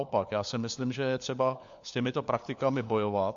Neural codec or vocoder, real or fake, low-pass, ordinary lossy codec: codec, 16 kHz, 4 kbps, FunCodec, trained on LibriTTS, 50 frames a second; fake; 7.2 kHz; AAC, 64 kbps